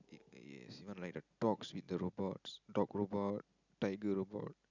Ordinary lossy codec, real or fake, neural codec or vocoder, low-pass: none; real; none; 7.2 kHz